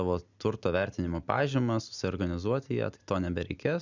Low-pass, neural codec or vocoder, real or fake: 7.2 kHz; none; real